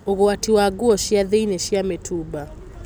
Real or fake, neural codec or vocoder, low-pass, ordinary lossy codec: real; none; none; none